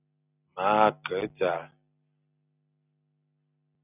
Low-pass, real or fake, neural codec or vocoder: 3.6 kHz; real; none